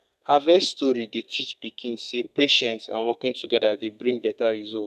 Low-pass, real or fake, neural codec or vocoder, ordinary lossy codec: 14.4 kHz; fake; codec, 32 kHz, 1.9 kbps, SNAC; none